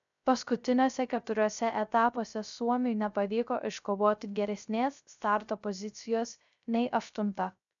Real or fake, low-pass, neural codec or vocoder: fake; 7.2 kHz; codec, 16 kHz, 0.3 kbps, FocalCodec